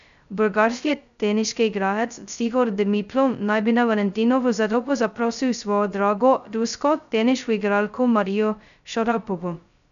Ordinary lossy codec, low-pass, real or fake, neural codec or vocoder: none; 7.2 kHz; fake; codec, 16 kHz, 0.2 kbps, FocalCodec